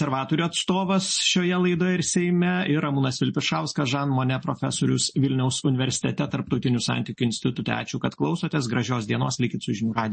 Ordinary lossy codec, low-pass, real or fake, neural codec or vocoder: MP3, 32 kbps; 10.8 kHz; real; none